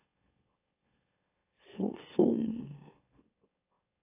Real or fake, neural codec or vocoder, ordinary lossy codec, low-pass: fake; autoencoder, 44.1 kHz, a latent of 192 numbers a frame, MeloTTS; AAC, 24 kbps; 3.6 kHz